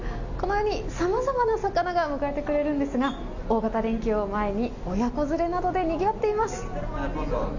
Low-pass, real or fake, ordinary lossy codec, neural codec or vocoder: 7.2 kHz; real; none; none